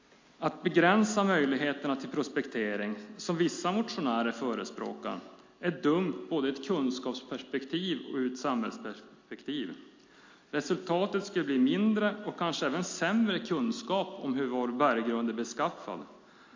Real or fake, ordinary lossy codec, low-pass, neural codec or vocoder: real; MP3, 48 kbps; 7.2 kHz; none